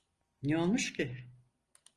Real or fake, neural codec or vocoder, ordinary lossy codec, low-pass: real; none; Opus, 24 kbps; 10.8 kHz